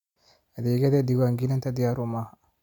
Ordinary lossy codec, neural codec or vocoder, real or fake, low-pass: none; none; real; 19.8 kHz